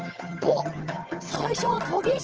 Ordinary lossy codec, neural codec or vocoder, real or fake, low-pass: Opus, 16 kbps; vocoder, 22.05 kHz, 80 mel bands, HiFi-GAN; fake; 7.2 kHz